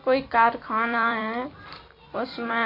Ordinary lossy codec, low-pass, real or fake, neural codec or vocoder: AAC, 32 kbps; 5.4 kHz; fake; vocoder, 44.1 kHz, 128 mel bands every 256 samples, BigVGAN v2